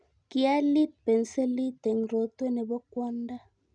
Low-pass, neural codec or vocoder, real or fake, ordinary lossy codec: 9.9 kHz; none; real; none